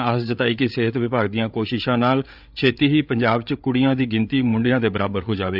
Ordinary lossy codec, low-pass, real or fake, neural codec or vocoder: none; 5.4 kHz; fake; codec, 16 kHz, 8 kbps, FreqCodec, larger model